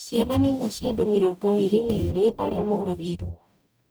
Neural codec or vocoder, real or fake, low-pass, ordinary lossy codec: codec, 44.1 kHz, 0.9 kbps, DAC; fake; none; none